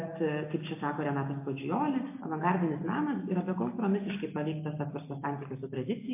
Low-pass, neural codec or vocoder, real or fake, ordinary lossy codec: 3.6 kHz; none; real; MP3, 24 kbps